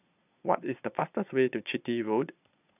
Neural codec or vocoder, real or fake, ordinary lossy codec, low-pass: vocoder, 22.05 kHz, 80 mel bands, Vocos; fake; none; 3.6 kHz